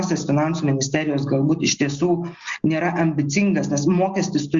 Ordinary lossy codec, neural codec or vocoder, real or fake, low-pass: Opus, 64 kbps; none; real; 7.2 kHz